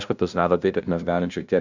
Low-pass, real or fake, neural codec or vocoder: 7.2 kHz; fake; codec, 16 kHz, 0.5 kbps, FunCodec, trained on LibriTTS, 25 frames a second